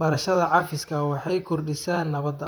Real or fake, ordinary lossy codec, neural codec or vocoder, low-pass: fake; none; vocoder, 44.1 kHz, 128 mel bands, Pupu-Vocoder; none